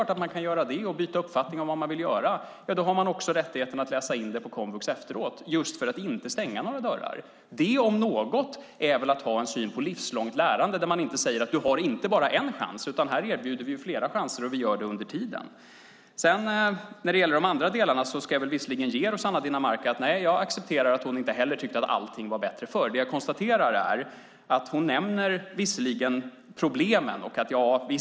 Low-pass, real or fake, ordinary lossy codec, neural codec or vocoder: none; real; none; none